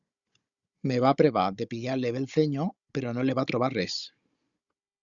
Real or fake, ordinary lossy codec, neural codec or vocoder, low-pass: fake; Opus, 64 kbps; codec, 16 kHz, 16 kbps, FunCodec, trained on Chinese and English, 50 frames a second; 7.2 kHz